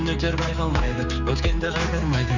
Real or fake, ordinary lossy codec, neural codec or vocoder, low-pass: fake; none; codec, 16 kHz, 4 kbps, X-Codec, HuBERT features, trained on general audio; 7.2 kHz